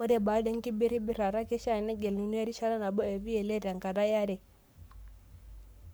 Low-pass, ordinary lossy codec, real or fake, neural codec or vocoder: none; none; fake; codec, 44.1 kHz, 7.8 kbps, Pupu-Codec